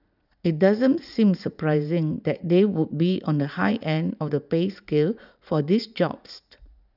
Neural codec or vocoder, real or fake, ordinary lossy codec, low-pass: vocoder, 44.1 kHz, 80 mel bands, Vocos; fake; none; 5.4 kHz